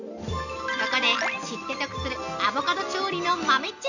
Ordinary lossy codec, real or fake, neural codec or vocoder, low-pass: none; real; none; 7.2 kHz